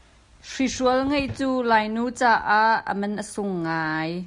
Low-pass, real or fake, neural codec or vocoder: 10.8 kHz; real; none